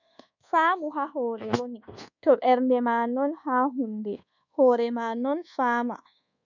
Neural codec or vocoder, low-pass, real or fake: codec, 24 kHz, 1.2 kbps, DualCodec; 7.2 kHz; fake